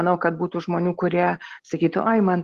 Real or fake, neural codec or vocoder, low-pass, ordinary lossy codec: real; none; 14.4 kHz; Opus, 16 kbps